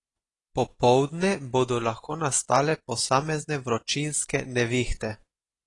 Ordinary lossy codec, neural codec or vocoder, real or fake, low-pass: AAC, 32 kbps; none; real; 10.8 kHz